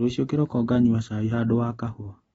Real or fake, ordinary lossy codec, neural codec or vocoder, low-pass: real; AAC, 24 kbps; none; 10.8 kHz